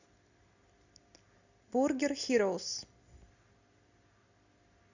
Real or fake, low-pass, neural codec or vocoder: real; 7.2 kHz; none